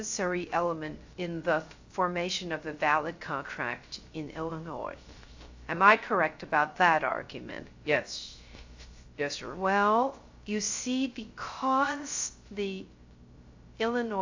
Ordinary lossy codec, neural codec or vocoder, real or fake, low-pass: AAC, 48 kbps; codec, 16 kHz, 0.3 kbps, FocalCodec; fake; 7.2 kHz